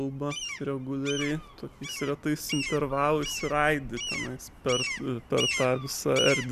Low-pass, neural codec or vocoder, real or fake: 14.4 kHz; none; real